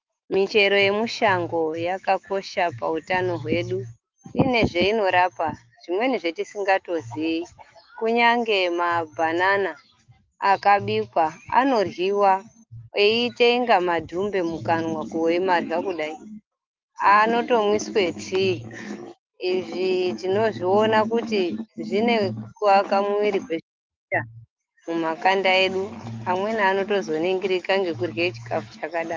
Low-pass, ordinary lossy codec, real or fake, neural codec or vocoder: 7.2 kHz; Opus, 24 kbps; fake; autoencoder, 48 kHz, 128 numbers a frame, DAC-VAE, trained on Japanese speech